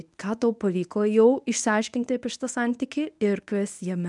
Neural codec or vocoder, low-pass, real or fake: codec, 24 kHz, 0.9 kbps, WavTokenizer, medium speech release version 1; 10.8 kHz; fake